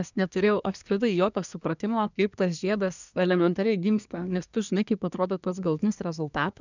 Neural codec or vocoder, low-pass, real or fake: codec, 24 kHz, 1 kbps, SNAC; 7.2 kHz; fake